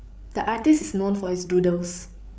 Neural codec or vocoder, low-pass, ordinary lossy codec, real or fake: codec, 16 kHz, 8 kbps, FreqCodec, larger model; none; none; fake